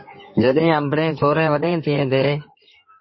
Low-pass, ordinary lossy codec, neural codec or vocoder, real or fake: 7.2 kHz; MP3, 24 kbps; codec, 16 kHz in and 24 kHz out, 2.2 kbps, FireRedTTS-2 codec; fake